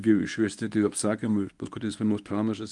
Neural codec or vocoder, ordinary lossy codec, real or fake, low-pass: codec, 24 kHz, 0.9 kbps, WavTokenizer, medium speech release version 1; Opus, 32 kbps; fake; 10.8 kHz